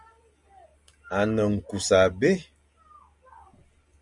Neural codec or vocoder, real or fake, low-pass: none; real; 10.8 kHz